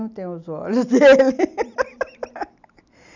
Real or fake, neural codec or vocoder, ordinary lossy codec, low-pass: real; none; none; 7.2 kHz